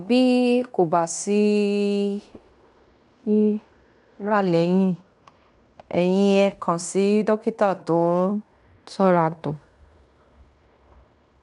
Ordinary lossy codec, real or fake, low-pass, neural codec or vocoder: none; fake; 10.8 kHz; codec, 16 kHz in and 24 kHz out, 0.9 kbps, LongCat-Audio-Codec, fine tuned four codebook decoder